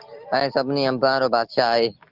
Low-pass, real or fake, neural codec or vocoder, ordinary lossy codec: 5.4 kHz; real; none; Opus, 16 kbps